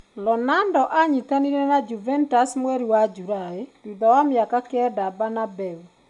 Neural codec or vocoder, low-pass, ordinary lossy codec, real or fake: none; 10.8 kHz; none; real